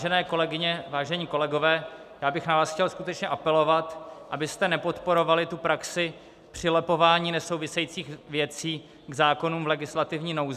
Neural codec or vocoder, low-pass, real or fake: none; 14.4 kHz; real